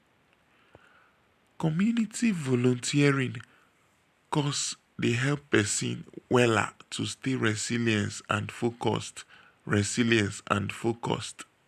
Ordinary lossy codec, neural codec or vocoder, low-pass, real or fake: none; none; 14.4 kHz; real